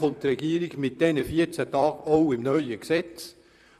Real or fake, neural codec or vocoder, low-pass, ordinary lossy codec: fake; vocoder, 44.1 kHz, 128 mel bands, Pupu-Vocoder; 14.4 kHz; none